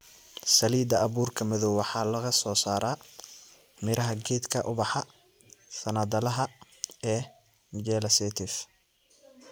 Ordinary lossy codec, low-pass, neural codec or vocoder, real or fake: none; none; none; real